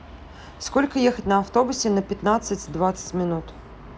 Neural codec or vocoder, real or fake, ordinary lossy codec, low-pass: none; real; none; none